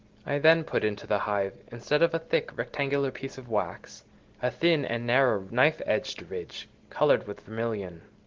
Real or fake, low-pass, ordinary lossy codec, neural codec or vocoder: real; 7.2 kHz; Opus, 16 kbps; none